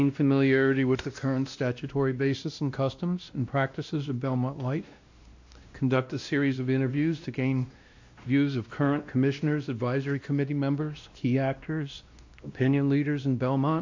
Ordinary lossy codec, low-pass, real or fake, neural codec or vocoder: AAC, 48 kbps; 7.2 kHz; fake; codec, 16 kHz, 1 kbps, X-Codec, WavLM features, trained on Multilingual LibriSpeech